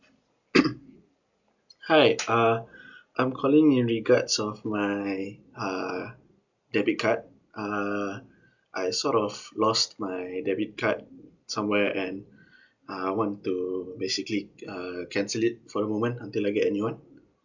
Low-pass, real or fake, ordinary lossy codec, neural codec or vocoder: 7.2 kHz; real; none; none